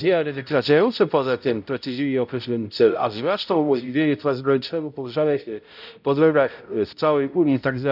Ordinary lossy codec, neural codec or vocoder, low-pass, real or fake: none; codec, 16 kHz, 0.5 kbps, X-Codec, HuBERT features, trained on balanced general audio; 5.4 kHz; fake